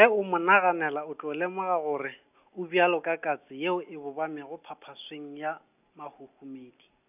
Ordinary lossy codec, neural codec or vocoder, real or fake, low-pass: none; none; real; 3.6 kHz